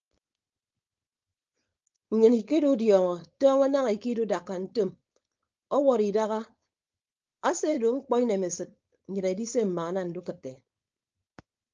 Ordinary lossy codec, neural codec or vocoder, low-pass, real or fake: Opus, 24 kbps; codec, 16 kHz, 4.8 kbps, FACodec; 7.2 kHz; fake